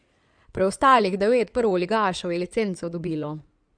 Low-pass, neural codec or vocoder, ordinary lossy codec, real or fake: 9.9 kHz; codec, 16 kHz in and 24 kHz out, 2.2 kbps, FireRedTTS-2 codec; none; fake